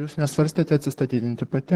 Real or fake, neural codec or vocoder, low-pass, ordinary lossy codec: fake; vocoder, 44.1 kHz, 128 mel bands, Pupu-Vocoder; 19.8 kHz; Opus, 16 kbps